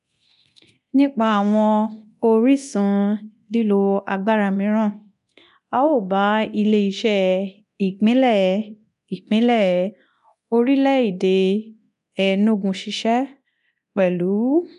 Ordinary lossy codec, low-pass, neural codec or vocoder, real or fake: none; 10.8 kHz; codec, 24 kHz, 0.9 kbps, DualCodec; fake